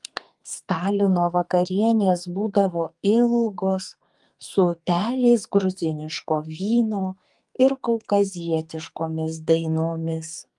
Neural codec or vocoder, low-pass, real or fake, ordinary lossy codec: codec, 44.1 kHz, 2.6 kbps, SNAC; 10.8 kHz; fake; Opus, 32 kbps